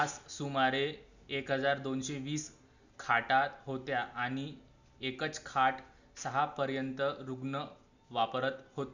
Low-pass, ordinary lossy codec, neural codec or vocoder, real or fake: 7.2 kHz; none; none; real